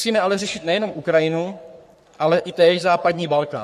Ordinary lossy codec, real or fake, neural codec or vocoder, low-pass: MP3, 64 kbps; fake; codec, 44.1 kHz, 3.4 kbps, Pupu-Codec; 14.4 kHz